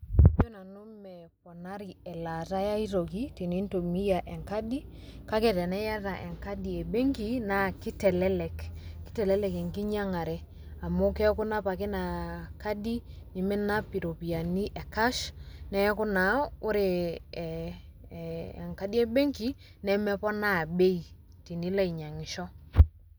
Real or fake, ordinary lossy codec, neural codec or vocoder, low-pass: real; none; none; none